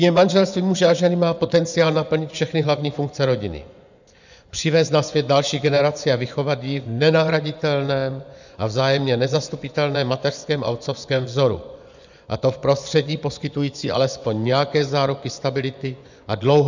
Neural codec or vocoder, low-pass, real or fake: vocoder, 24 kHz, 100 mel bands, Vocos; 7.2 kHz; fake